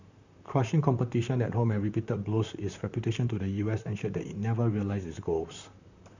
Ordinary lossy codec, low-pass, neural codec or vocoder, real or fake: none; 7.2 kHz; vocoder, 44.1 kHz, 128 mel bands, Pupu-Vocoder; fake